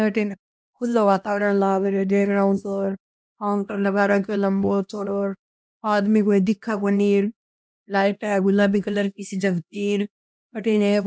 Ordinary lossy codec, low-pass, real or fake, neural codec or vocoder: none; none; fake; codec, 16 kHz, 1 kbps, X-Codec, HuBERT features, trained on LibriSpeech